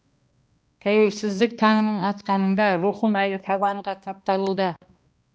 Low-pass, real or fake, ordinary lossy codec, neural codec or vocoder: none; fake; none; codec, 16 kHz, 1 kbps, X-Codec, HuBERT features, trained on balanced general audio